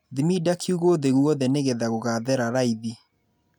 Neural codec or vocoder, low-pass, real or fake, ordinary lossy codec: none; 19.8 kHz; real; none